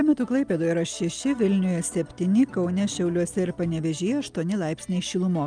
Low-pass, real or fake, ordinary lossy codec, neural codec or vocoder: 9.9 kHz; real; Opus, 32 kbps; none